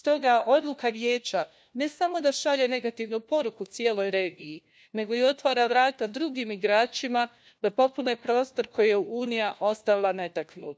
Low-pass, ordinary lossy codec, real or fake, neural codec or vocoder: none; none; fake; codec, 16 kHz, 1 kbps, FunCodec, trained on LibriTTS, 50 frames a second